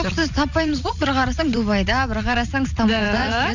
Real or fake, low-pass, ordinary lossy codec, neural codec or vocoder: fake; 7.2 kHz; none; vocoder, 44.1 kHz, 80 mel bands, Vocos